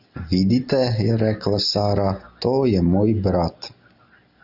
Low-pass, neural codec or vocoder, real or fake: 5.4 kHz; none; real